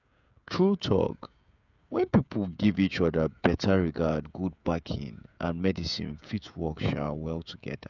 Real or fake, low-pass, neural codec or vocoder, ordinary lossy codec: fake; 7.2 kHz; codec, 16 kHz, 16 kbps, FreqCodec, smaller model; none